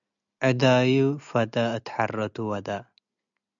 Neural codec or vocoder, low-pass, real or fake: none; 7.2 kHz; real